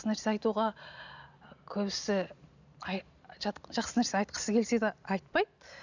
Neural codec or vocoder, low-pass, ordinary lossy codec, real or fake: none; 7.2 kHz; none; real